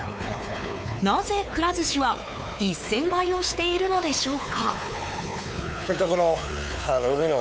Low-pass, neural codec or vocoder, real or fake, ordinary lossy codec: none; codec, 16 kHz, 4 kbps, X-Codec, WavLM features, trained on Multilingual LibriSpeech; fake; none